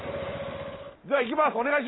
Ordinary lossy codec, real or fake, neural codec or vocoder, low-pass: AAC, 16 kbps; real; none; 7.2 kHz